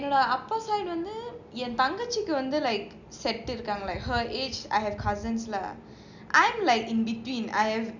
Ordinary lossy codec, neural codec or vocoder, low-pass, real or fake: none; none; 7.2 kHz; real